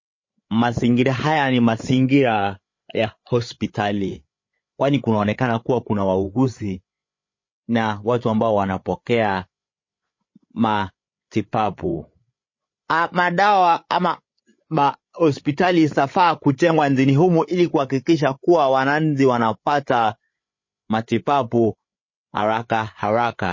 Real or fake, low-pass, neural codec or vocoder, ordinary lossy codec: fake; 7.2 kHz; codec, 16 kHz, 8 kbps, FreqCodec, larger model; MP3, 32 kbps